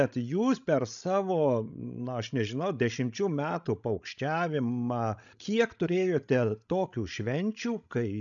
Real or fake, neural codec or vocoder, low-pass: fake; codec, 16 kHz, 16 kbps, FreqCodec, larger model; 7.2 kHz